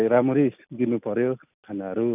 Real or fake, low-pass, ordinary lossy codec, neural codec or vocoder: real; 3.6 kHz; none; none